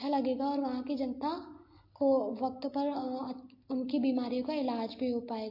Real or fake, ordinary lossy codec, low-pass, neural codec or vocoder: real; MP3, 32 kbps; 5.4 kHz; none